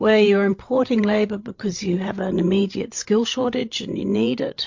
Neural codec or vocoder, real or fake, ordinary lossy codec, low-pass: codec, 16 kHz, 8 kbps, FreqCodec, larger model; fake; MP3, 48 kbps; 7.2 kHz